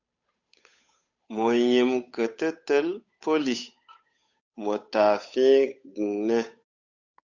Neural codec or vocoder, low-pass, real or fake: codec, 16 kHz, 8 kbps, FunCodec, trained on Chinese and English, 25 frames a second; 7.2 kHz; fake